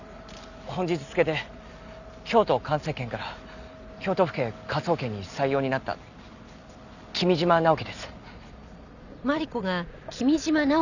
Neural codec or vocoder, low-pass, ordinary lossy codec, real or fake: none; 7.2 kHz; none; real